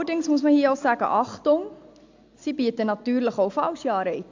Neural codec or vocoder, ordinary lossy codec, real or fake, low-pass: none; AAC, 48 kbps; real; 7.2 kHz